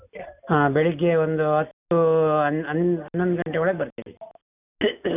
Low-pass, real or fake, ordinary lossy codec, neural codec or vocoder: 3.6 kHz; real; none; none